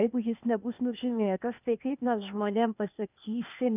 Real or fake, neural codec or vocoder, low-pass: fake; codec, 16 kHz, 0.8 kbps, ZipCodec; 3.6 kHz